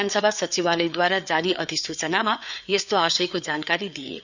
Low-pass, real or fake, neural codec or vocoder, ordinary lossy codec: 7.2 kHz; fake; codec, 16 kHz, 4 kbps, FreqCodec, larger model; none